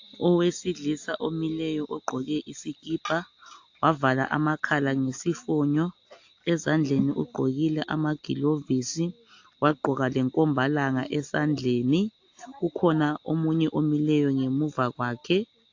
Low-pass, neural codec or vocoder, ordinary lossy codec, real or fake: 7.2 kHz; none; AAC, 48 kbps; real